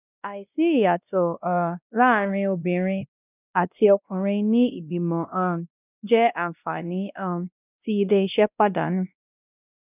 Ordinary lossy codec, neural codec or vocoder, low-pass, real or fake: none; codec, 16 kHz, 1 kbps, X-Codec, WavLM features, trained on Multilingual LibriSpeech; 3.6 kHz; fake